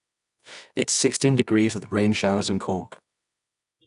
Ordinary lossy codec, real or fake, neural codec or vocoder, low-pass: none; fake; codec, 24 kHz, 0.9 kbps, WavTokenizer, medium music audio release; 10.8 kHz